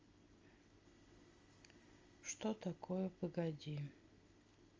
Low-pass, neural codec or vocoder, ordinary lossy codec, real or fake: 7.2 kHz; none; Opus, 32 kbps; real